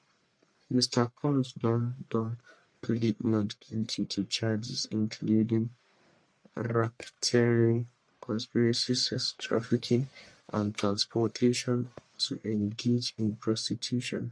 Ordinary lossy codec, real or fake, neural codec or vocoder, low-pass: MP3, 64 kbps; fake; codec, 44.1 kHz, 1.7 kbps, Pupu-Codec; 9.9 kHz